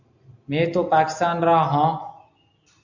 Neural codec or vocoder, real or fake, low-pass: none; real; 7.2 kHz